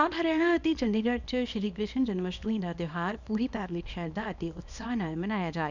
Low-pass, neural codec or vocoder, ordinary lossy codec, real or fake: 7.2 kHz; codec, 24 kHz, 0.9 kbps, WavTokenizer, small release; none; fake